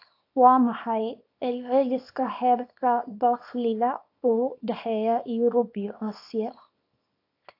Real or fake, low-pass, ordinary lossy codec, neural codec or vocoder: fake; 5.4 kHz; AAC, 32 kbps; codec, 24 kHz, 0.9 kbps, WavTokenizer, small release